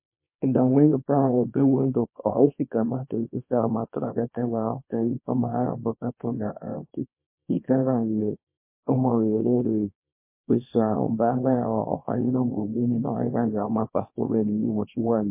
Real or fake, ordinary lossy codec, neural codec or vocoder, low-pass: fake; MP3, 24 kbps; codec, 24 kHz, 0.9 kbps, WavTokenizer, small release; 3.6 kHz